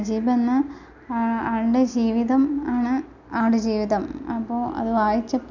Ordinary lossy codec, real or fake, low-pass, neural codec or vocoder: none; real; 7.2 kHz; none